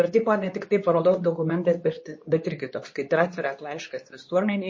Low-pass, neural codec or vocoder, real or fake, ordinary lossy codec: 7.2 kHz; codec, 24 kHz, 0.9 kbps, WavTokenizer, medium speech release version 2; fake; MP3, 32 kbps